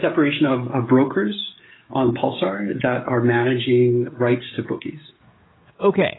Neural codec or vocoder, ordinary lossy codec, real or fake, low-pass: codec, 16 kHz, 16 kbps, FreqCodec, smaller model; AAC, 16 kbps; fake; 7.2 kHz